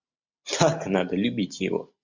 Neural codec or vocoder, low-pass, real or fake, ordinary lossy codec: none; 7.2 kHz; real; MP3, 64 kbps